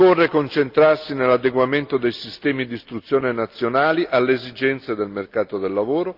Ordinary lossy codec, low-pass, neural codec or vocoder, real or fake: Opus, 24 kbps; 5.4 kHz; none; real